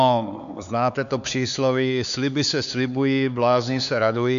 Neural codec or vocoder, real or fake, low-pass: codec, 16 kHz, 4 kbps, X-Codec, HuBERT features, trained on LibriSpeech; fake; 7.2 kHz